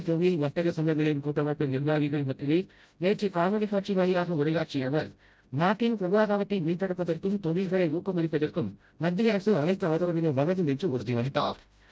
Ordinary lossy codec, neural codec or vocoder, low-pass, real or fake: none; codec, 16 kHz, 0.5 kbps, FreqCodec, smaller model; none; fake